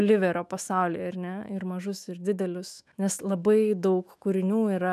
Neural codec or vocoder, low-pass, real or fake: autoencoder, 48 kHz, 128 numbers a frame, DAC-VAE, trained on Japanese speech; 14.4 kHz; fake